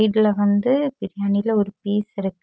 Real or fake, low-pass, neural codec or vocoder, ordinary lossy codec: real; none; none; none